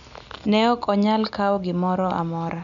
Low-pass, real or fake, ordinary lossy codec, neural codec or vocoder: 7.2 kHz; real; none; none